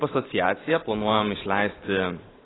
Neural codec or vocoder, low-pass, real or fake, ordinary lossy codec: vocoder, 44.1 kHz, 128 mel bands every 512 samples, BigVGAN v2; 7.2 kHz; fake; AAC, 16 kbps